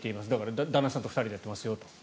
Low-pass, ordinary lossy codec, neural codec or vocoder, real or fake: none; none; none; real